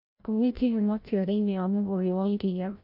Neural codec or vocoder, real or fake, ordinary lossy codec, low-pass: codec, 16 kHz, 0.5 kbps, FreqCodec, larger model; fake; AAC, 48 kbps; 5.4 kHz